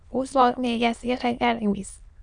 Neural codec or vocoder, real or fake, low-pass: autoencoder, 22.05 kHz, a latent of 192 numbers a frame, VITS, trained on many speakers; fake; 9.9 kHz